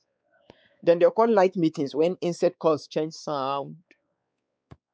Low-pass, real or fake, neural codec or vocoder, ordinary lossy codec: none; fake; codec, 16 kHz, 4 kbps, X-Codec, WavLM features, trained on Multilingual LibriSpeech; none